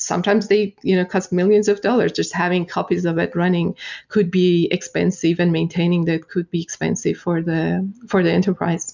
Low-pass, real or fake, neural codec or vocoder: 7.2 kHz; real; none